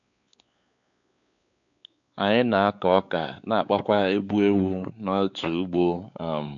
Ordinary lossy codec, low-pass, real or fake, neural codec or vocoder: none; 7.2 kHz; fake; codec, 16 kHz, 4 kbps, X-Codec, WavLM features, trained on Multilingual LibriSpeech